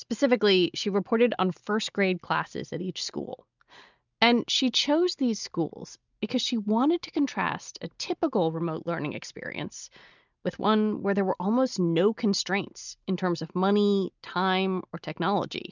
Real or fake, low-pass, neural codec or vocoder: real; 7.2 kHz; none